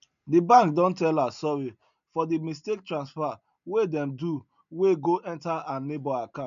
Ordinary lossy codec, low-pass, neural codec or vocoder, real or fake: none; 7.2 kHz; none; real